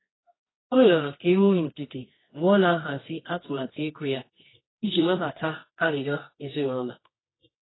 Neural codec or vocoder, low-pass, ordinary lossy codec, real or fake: codec, 24 kHz, 0.9 kbps, WavTokenizer, medium music audio release; 7.2 kHz; AAC, 16 kbps; fake